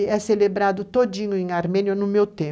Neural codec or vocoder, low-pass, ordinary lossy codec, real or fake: none; none; none; real